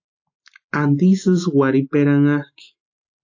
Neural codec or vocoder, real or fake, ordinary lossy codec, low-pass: none; real; AAC, 48 kbps; 7.2 kHz